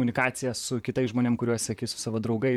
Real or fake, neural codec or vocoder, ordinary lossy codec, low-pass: fake; vocoder, 44.1 kHz, 128 mel bands, Pupu-Vocoder; MP3, 96 kbps; 19.8 kHz